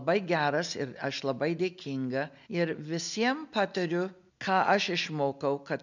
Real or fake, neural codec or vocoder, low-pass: real; none; 7.2 kHz